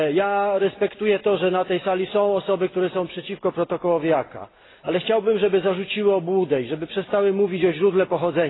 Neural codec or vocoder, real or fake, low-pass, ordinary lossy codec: none; real; 7.2 kHz; AAC, 16 kbps